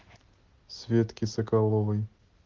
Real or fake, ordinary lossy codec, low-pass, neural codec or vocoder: real; Opus, 16 kbps; 7.2 kHz; none